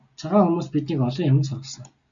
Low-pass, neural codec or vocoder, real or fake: 7.2 kHz; none; real